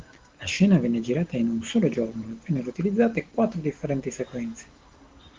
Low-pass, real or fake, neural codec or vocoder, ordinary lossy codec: 7.2 kHz; real; none; Opus, 16 kbps